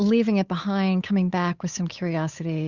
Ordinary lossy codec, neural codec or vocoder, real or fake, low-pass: Opus, 64 kbps; codec, 16 kHz, 8 kbps, FunCodec, trained on Chinese and English, 25 frames a second; fake; 7.2 kHz